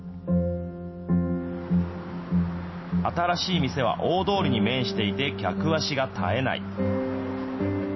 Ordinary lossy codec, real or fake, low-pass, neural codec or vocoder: MP3, 24 kbps; real; 7.2 kHz; none